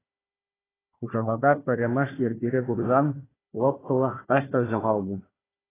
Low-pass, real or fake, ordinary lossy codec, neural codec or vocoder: 3.6 kHz; fake; AAC, 16 kbps; codec, 16 kHz, 1 kbps, FunCodec, trained on Chinese and English, 50 frames a second